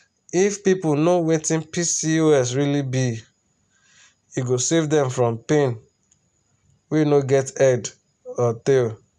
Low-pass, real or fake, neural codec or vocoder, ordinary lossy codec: none; real; none; none